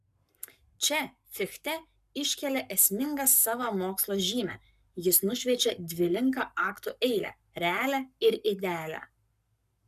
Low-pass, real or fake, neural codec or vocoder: 14.4 kHz; fake; codec, 44.1 kHz, 7.8 kbps, Pupu-Codec